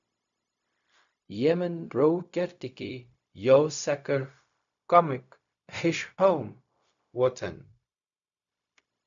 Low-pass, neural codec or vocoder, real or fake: 7.2 kHz; codec, 16 kHz, 0.4 kbps, LongCat-Audio-Codec; fake